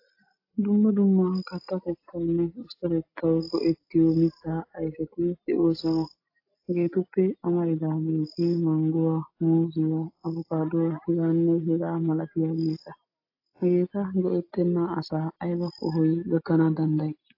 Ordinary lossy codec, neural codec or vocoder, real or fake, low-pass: AAC, 32 kbps; none; real; 5.4 kHz